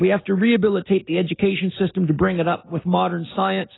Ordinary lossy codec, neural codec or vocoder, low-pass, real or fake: AAC, 16 kbps; none; 7.2 kHz; real